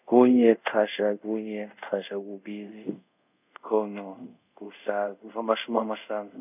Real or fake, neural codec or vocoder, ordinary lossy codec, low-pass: fake; codec, 24 kHz, 0.5 kbps, DualCodec; none; 3.6 kHz